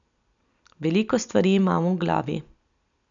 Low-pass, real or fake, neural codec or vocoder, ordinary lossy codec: 7.2 kHz; real; none; none